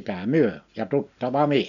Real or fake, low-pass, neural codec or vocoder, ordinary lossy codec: real; 7.2 kHz; none; none